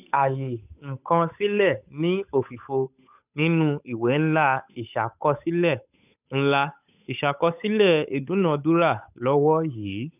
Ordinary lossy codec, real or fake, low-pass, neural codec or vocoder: none; fake; 3.6 kHz; codec, 16 kHz, 8 kbps, FunCodec, trained on Chinese and English, 25 frames a second